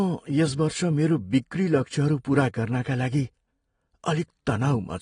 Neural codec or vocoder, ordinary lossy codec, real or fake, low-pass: none; AAC, 32 kbps; real; 9.9 kHz